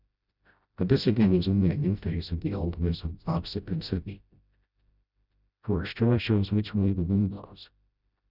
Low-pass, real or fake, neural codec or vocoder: 5.4 kHz; fake; codec, 16 kHz, 0.5 kbps, FreqCodec, smaller model